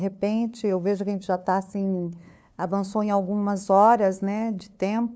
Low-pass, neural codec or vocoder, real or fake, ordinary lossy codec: none; codec, 16 kHz, 2 kbps, FunCodec, trained on LibriTTS, 25 frames a second; fake; none